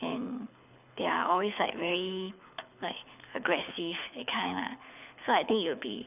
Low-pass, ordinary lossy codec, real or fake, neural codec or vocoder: 3.6 kHz; none; fake; codec, 24 kHz, 6 kbps, HILCodec